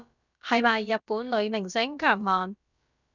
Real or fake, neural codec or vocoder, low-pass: fake; codec, 16 kHz, about 1 kbps, DyCAST, with the encoder's durations; 7.2 kHz